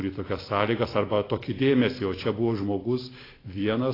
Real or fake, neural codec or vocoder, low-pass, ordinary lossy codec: real; none; 5.4 kHz; AAC, 24 kbps